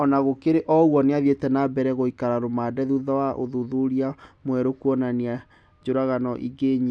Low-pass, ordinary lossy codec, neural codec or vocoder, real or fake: none; none; none; real